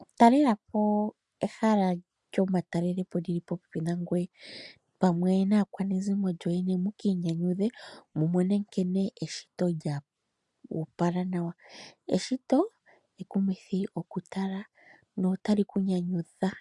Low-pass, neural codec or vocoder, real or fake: 10.8 kHz; none; real